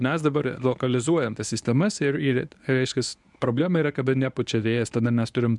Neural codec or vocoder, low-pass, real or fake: codec, 24 kHz, 0.9 kbps, WavTokenizer, medium speech release version 1; 10.8 kHz; fake